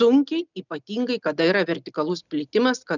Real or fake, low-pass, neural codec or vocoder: real; 7.2 kHz; none